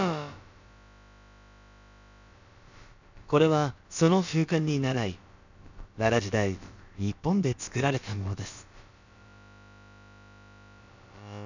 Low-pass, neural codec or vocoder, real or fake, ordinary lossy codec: 7.2 kHz; codec, 16 kHz, about 1 kbps, DyCAST, with the encoder's durations; fake; none